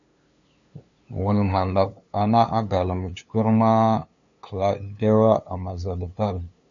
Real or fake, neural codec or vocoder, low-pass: fake; codec, 16 kHz, 2 kbps, FunCodec, trained on LibriTTS, 25 frames a second; 7.2 kHz